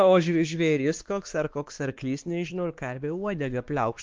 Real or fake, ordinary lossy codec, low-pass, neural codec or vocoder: fake; Opus, 32 kbps; 7.2 kHz; codec, 16 kHz, 2 kbps, X-Codec, WavLM features, trained on Multilingual LibriSpeech